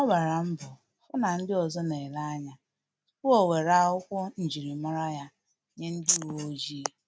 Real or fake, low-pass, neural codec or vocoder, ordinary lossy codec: real; none; none; none